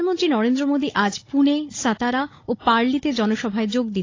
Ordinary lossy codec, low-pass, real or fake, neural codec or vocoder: AAC, 32 kbps; 7.2 kHz; fake; codec, 16 kHz, 16 kbps, FunCodec, trained on Chinese and English, 50 frames a second